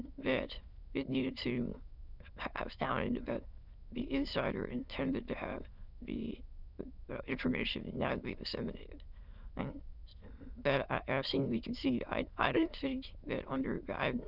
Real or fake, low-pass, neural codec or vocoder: fake; 5.4 kHz; autoencoder, 22.05 kHz, a latent of 192 numbers a frame, VITS, trained on many speakers